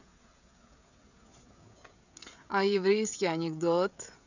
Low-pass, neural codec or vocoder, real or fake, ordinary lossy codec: 7.2 kHz; codec, 16 kHz, 16 kbps, FreqCodec, smaller model; fake; none